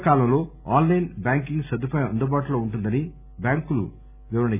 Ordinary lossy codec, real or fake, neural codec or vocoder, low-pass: none; real; none; 3.6 kHz